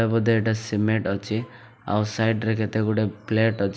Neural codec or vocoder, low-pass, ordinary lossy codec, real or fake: none; none; none; real